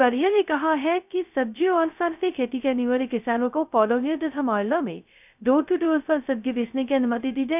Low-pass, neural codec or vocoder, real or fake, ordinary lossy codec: 3.6 kHz; codec, 16 kHz, 0.2 kbps, FocalCodec; fake; none